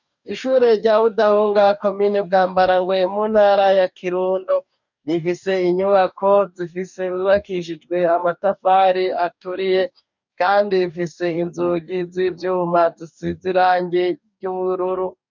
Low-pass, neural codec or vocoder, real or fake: 7.2 kHz; codec, 44.1 kHz, 2.6 kbps, DAC; fake